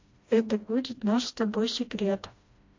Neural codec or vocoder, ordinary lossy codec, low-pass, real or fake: codec, 16 kHz, 1 kbps, FreqCodec, smaller model; MP3, 48 kbps; 7.2 kHz; fake